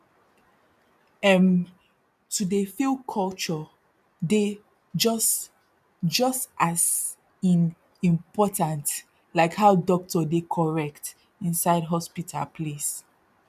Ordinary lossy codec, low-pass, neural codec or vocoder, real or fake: none; 14.4 kHz; none; real